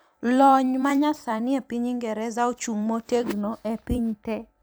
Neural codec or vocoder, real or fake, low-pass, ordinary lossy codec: vocoder, 44.1 kHz, 128 mel bands every 256 samples, BigVGAN v2; fake; none; none